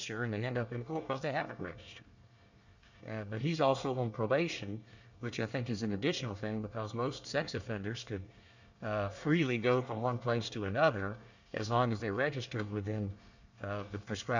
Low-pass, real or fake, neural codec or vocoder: 7.2 kHz; fake; codec, 24 kHz, 1 kbps, SNAC